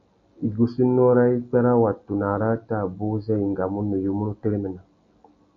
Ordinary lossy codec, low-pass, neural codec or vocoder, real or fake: AAC, 48 kbps; 7.2 kHz; none; real